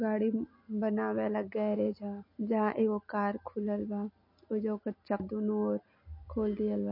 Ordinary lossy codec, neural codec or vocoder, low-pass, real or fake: MP3, 32 kbps; none; 5.4 kHz; real